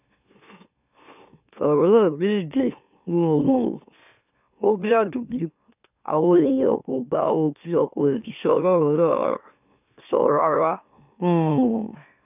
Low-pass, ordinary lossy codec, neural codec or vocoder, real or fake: 3.6 kHz; none; autoencoder, 44.1 kHz, a latent of 192 numbers a frame, MeloTTS; fake